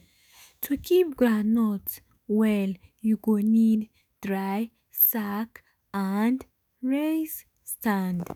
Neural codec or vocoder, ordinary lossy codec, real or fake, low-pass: autoencoder, 48 kHz, 128 numbers a frame, DAC-VAE, trained on Japanese speech; none; fake; none